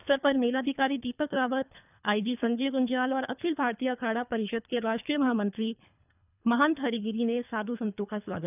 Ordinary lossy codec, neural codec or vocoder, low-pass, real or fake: none; codec, 24 kHz, 3 kbps, HILCodec; 3.6 kHz; fake